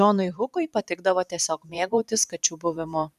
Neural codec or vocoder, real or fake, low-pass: vocoder, 44.1 kHz, 128 mel bands every 512 samples, BigVGAN v2; fake; 14.4 kHz